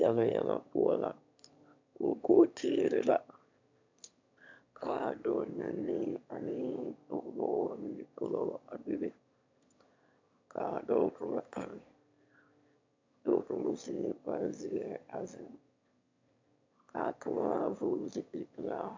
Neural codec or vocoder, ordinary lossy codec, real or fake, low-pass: autoencoder, 22.05 kHz, a latent of 192 numbers a frame, VITS, trained on one speaker; MP3, 64 kbps; fake; 7.2 kHz